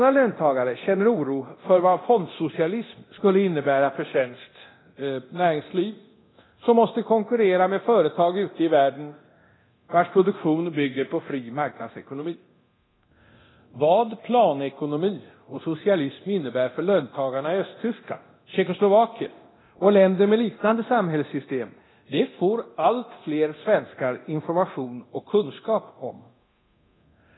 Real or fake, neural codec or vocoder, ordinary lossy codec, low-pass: fake; codec, 24 kHz, 0.9 kbps, DualCodec; AAC, 16 kbps; 7.2 kHz